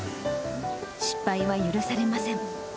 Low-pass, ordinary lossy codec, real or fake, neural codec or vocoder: none; none; real; none